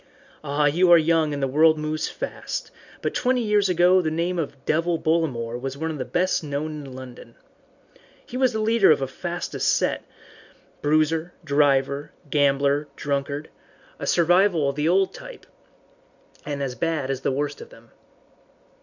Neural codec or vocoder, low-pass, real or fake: none; 7.2 kHz; real